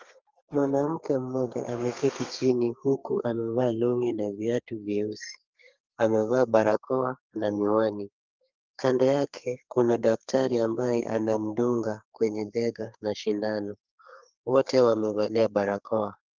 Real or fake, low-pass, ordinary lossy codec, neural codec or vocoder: fake; 7.2 kHz; Opus, 24 kbps; codec, 32 kHz, 1.9 kbps, SNAC